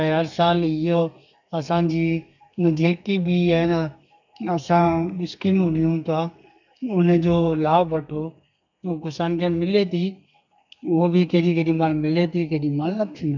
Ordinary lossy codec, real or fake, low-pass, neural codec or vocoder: none; fake; 7.2 kHz; codec, 32 kHz, 1.9 kbps, SNAC